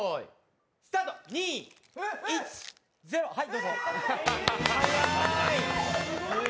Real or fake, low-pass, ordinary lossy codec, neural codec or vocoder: real; none; none; none